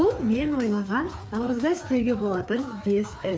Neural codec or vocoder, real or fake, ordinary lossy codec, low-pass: codec, 16 kHz, 4 kbps, FreqCodec, larger model; fake; none; none